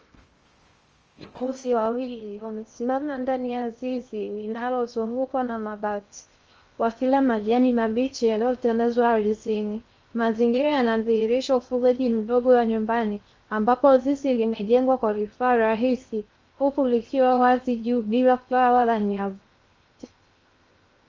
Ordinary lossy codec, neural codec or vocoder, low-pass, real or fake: Opus, 24 kbps; codec, 16 kHz in and 24 kHz out, 0.6 kbps, FocalCodec, streaming, 4096 codes; 7.2 kHz; fake